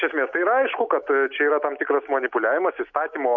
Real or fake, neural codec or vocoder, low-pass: real; none; 7.2 kHz